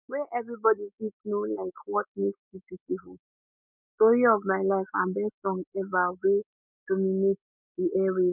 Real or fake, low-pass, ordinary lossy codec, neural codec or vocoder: real; 3.6 kHz; none; none